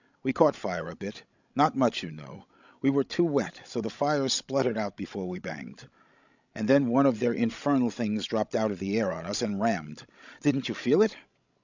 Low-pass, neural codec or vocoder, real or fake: 7.2 kHz; codec, 16 kHz, 16 kbps, FreqCodec, larger model; fake